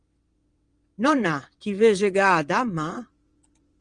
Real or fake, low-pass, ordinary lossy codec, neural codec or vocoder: fake; 9.9 kHz; Opus, 24 kbps; vocoder, 22.05 kHz, 80 mel bands, WaveNeXt